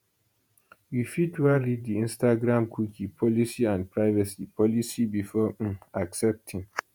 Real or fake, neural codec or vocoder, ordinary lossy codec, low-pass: fake; vocoder, 48 kHz, 128 mel bands, Vocos; none; none